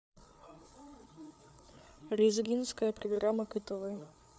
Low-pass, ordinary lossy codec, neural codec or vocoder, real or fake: none; none; codec, 16 kHz, 8 kbps, FreqCodec, larger model; fake